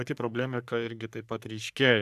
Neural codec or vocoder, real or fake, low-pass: codec, 44.1 kHz, 3.4 kbps, Pupu-Codec; fake; 14.4 kHz